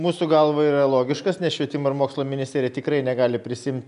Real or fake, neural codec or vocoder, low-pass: real; none; 10.8 kHz